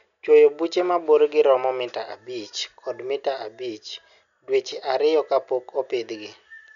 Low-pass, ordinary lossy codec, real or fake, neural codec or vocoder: 7.2 kHz; none; real; none